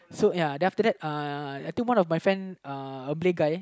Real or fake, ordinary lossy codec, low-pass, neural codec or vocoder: real; none; none; none